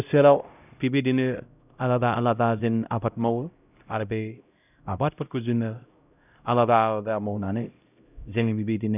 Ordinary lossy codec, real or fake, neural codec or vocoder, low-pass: none; fake; codec, 16 kHz, 0.5 kbps, X-Codec, HuBERT features, trained on LibriSpeech; 3.6 kHz